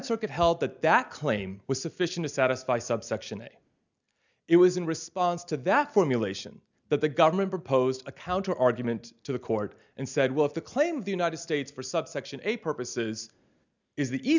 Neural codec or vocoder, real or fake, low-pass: none; real; 7.2 kHz